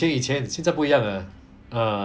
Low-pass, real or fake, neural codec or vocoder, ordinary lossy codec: none; real; none; none